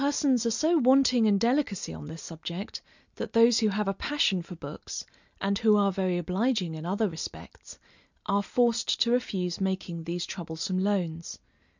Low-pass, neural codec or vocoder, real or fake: 7.2 kHz; none; real